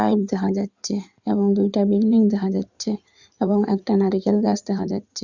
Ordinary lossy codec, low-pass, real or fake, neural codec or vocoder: Opus, 64 kbps; 7.2 kHz; fake; codec, 16 kHz in and 24 kHz out, 2.2 kbps, FireRedTTS-2 codec